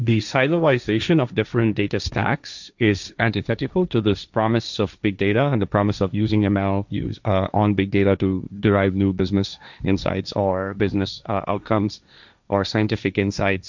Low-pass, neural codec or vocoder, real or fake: 7.2 kHz; codec, 16 kHz, 1.1 kbps, Voila-Tokenizer; fake